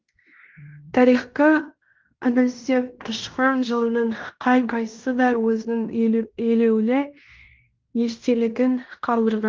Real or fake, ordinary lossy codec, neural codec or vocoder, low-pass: fake; Opus, 32 kbps; codec, 16 kHz in and 24 kHz out, 0.9 kbps, LongCat-Audio-Codec, fine tuned four codebook decoder; 7.2 kHz